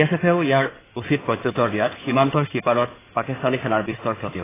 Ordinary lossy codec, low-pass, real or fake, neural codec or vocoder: AAC, 16 kbps; 3.6 kHz; fake; codec, 16 kHz in and 24 kHz out, 2.2 kbps, FireRedTTS-2 codec